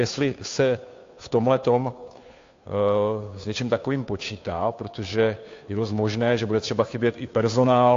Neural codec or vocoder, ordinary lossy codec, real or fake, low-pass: codec, 16 kHz, 2 kbps, FunCodec, trained on Chinese and English, 25 frames a second; AAC, 48 kbps; fake; 7.2 kHz